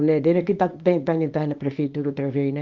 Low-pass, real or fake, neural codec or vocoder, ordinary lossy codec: 7.2 kHz; fake; codec, 24 kHz, 0.9 kbps, WavTokenizer, small release; Opus, 32 kbps